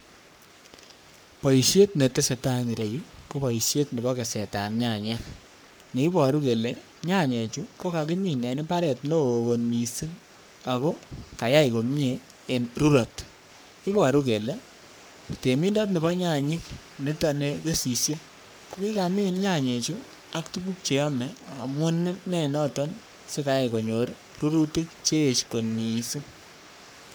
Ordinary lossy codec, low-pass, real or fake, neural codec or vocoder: none; none; fake; codec, 44.1 kHz, 3.4 kbps, Pupu-Codec